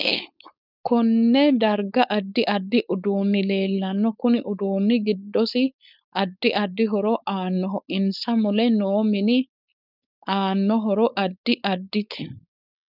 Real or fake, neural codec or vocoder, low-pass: fake; codec, 16 kHz, 4.8 kbps, FACodec; 5.4 kHz